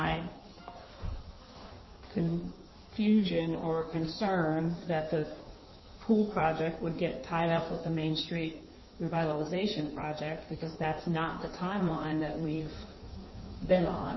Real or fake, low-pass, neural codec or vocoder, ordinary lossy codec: fake; 7.2 kHz; codec, 16 kHz in and 24 kHz out, 1.1 kbps, FireRedTTS-2 codec; MP3, 24 kbps